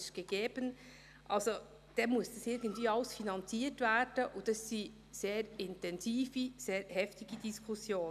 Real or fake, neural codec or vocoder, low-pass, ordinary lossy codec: real; none; 14.4 kHz; none